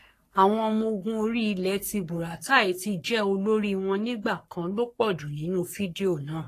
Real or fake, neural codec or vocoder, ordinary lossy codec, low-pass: fake; codec, 44.1 kHz, 3.4 kbps, Pupu-Codec; AAC, 48 kbps; 14.4 kHz